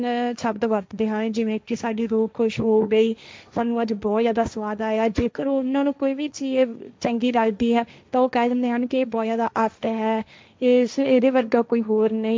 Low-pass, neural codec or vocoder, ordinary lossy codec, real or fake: none; codec, 16 kHz, 1.1 kbps, Voila-Tokenizer; none; fake